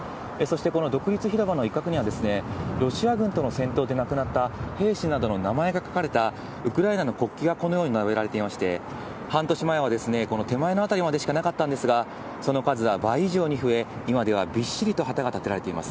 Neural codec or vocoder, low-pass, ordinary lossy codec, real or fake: none; none; none; real